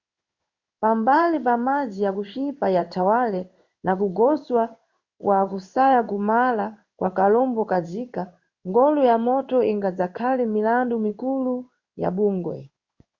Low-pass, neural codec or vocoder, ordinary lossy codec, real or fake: 7.2 kHz; codec, 16 kHz in and 24 kHz out, 1 kbps, XY-Tokenizer; Opus, 64 kbps; fake